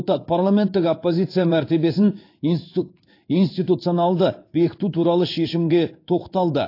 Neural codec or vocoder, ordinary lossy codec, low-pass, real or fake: codec, 16 kHz in and 24 kHz out, 1 kbps, XY-Tokenizer; AAC, 32 kbps; 5.4 kHz; fake